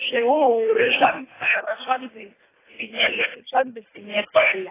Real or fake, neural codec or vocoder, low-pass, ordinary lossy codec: fake; codec, 24 kHz, 1.5 kbps, HILCodec; 3.6 kHz; AAC, 16 kbps